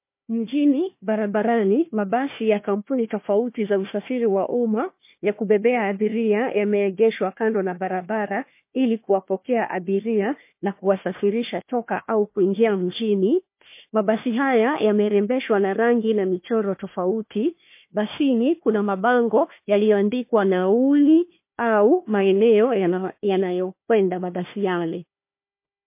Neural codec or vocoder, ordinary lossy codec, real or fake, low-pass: codec, 16 kHz, 1 kbps, FunCodec, trained on Chinese and English, 50 frames a second; MP3, 24 kbps; fake; 3.6 kHz